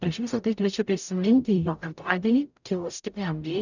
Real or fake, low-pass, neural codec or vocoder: fake; 7.2 kHz; codec, 44.1 kHz, 0.9 kbps, DAC